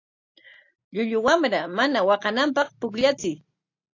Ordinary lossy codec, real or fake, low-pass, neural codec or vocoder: AAC, 48 kbps; real; 7.2 kHz; none